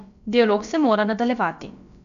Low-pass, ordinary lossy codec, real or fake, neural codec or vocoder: 7.2 kHz; none; fake; codec, 16 kHz, about 1 kbps, DyCAST, with the encoder's durations